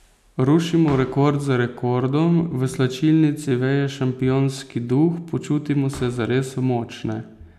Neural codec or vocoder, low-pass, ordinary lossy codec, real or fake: none; 14.4 kHz; none; real